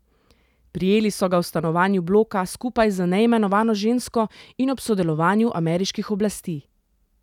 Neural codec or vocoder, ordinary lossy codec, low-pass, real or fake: none; none; 19.8 kHz; real